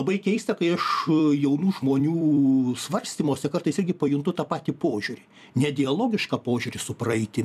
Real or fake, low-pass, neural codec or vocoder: fake; 14.4 kHz; vocoder, 44.1 kHz, 128 mel bands every 256 samples, BigVGAN v2